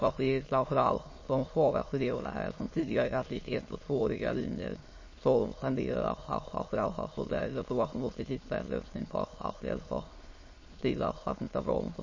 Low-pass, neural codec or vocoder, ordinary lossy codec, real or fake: 7.2 kHz; autoencoder, 22.05 kHz, a latent of 192 numbers a frame, VITS, trained on many speakers; MP3, 32 kbps; fake